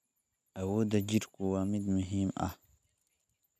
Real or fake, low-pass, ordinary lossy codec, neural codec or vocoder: real; 14.4 kHz; none; none